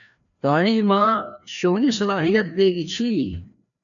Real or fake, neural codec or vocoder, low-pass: fake; codec, 16 kHz, 1 kbps, FreqCodec, larger model; 7.2 kHz